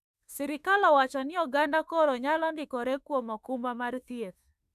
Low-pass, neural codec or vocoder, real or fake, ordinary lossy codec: 14.4 kHz; autoencoder, 48 kHz, 32 numbers a frame, DAC-VAE, trained on Japanese speech; fake; none